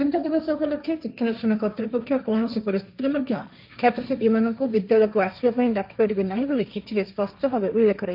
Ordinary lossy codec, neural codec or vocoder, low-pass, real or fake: none; codec, 16 kHz, 1.1 kbps, Voila-Tokenizer; 5.4 kHz; fake